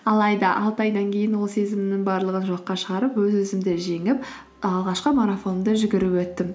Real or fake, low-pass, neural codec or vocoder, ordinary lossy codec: real; none; none; none